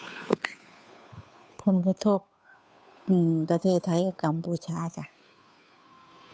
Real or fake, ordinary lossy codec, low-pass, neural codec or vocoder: fake; none; none; codec, 16 kHz, 2 kbps, FunCodec, trained on Chinese and English, 25 frames a second